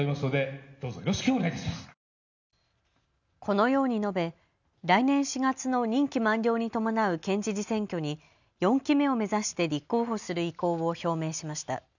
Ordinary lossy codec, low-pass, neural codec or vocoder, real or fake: none; 7.2 kHz; none; real